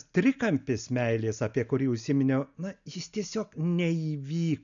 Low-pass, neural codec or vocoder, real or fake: 7.2 kHz; none; real